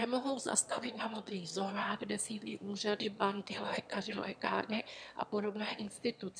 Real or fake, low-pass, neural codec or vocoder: fake; 9.9 kHz; autoencoder, 22.05 kHz, a latent of 192 numbers a frame, VITS, trained on one speaker